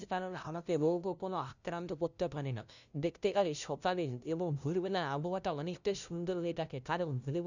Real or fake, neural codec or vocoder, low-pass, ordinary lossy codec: fake; codec, 16 kHz, 0.5 kbps, FunCodec, trained on LibriTTS, 25 frames a second; 7.2 kHz; none